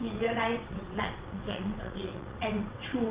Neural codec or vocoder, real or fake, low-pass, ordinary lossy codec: vocoder, 22.05 kHz, 80 mel bands, WaveNeXt; fake; 3.6 kHz; Opus, 32 kbps